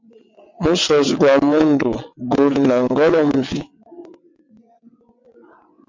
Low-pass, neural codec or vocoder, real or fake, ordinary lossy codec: 7.2 kHz; vocoder, 22.05 kHz, 80 mel bands, WaveNeXt; fake; MP3, 48 kbps